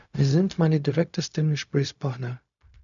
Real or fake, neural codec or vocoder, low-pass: fake; codec, 16 kHz, 0.4 kbps, LongCat-Audio-Codec; 7.2 kHz